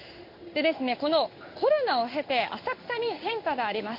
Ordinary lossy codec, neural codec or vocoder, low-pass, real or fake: MP3, 32 kbps; codec, 16 kHz in and 24 kHz out, 1 kbps, XY-Tokenizer; 5.4 kHz; fake